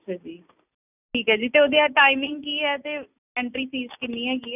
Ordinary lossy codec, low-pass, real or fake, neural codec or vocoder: none; 3.6 kHz; real; none